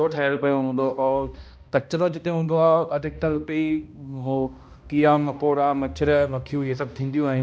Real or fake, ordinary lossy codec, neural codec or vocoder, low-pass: fake; none; codec, 16 kHz, 1 kbps, X-Codec, HuBERT features, trained on balanced general audio; none